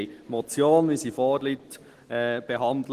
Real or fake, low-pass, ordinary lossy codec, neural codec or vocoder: real; 14.4 kHz; Opus, 16 kbps; none